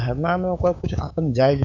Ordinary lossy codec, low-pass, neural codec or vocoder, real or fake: none; 7.2 kHz; codec, 16 kHz, 6 kbps, DAC; fake